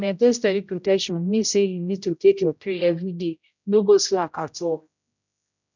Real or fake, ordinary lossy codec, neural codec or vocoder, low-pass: fake; none; codec, 16 kHz, 0.5 kbps, X-Codec, HuBERT features, trained on general audio; 7.2 kHz